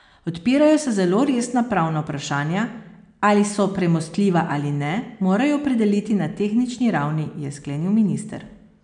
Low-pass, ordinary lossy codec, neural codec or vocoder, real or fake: 9.9 kHz; none; none; real